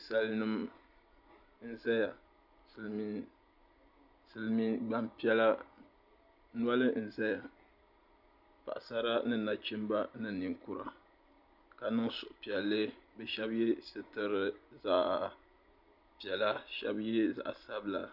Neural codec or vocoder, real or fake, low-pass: none; real; 5.4 kHz